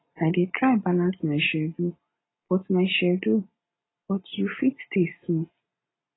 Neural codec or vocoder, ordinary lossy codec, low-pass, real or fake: none; AAC, 16 kbps; 7.2 kHz; real